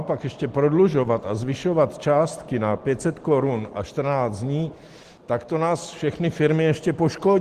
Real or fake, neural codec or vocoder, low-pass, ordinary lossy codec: real; none; 14.4 kHz; Opus, 16 kbps